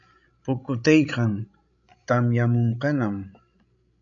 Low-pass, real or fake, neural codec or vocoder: 7.2 kHz; fake; codec, 16 kHz, 16 kbps, FreqCodec, larger model